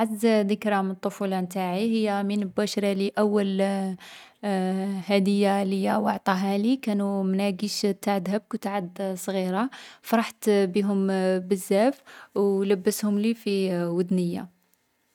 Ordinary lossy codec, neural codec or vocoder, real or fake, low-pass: none; none; real; 19.8 kHz